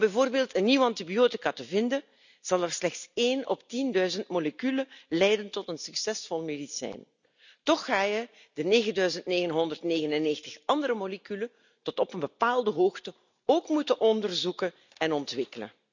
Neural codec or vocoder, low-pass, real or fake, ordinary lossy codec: none; 7.2 kHz; real; none